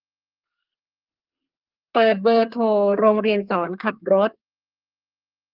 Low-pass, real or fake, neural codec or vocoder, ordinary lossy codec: 5.4 kHz; fake; codec, 32 kHz, 1.9 kbps, SNAC; Opus, 32 kbps